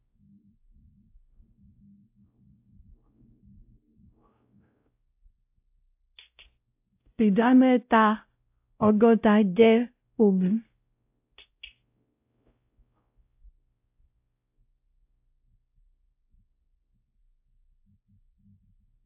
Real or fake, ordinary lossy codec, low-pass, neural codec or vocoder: fake; AAC, 32 kbps; 3.6 kHz; codec, 16 kHz, 0.5 kbps, X-Codec, WavLM features, trained on Multilingual LibriSpeech